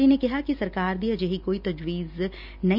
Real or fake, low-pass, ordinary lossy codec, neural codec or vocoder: real; 5.4 kHz; none; none